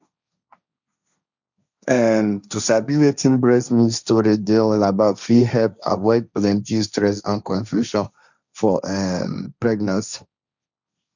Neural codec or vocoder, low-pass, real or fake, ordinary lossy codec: codec, 16 kHz, 1.1 kbps, Voila-Tokenizer; 7.2 kHz; fake; none